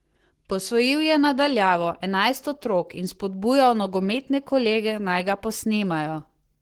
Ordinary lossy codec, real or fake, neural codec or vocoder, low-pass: Opus, 16 kbps; fake; codec, 44.1 kHz, 7.8 kbps, Pupu-Codec; 19.8 kHz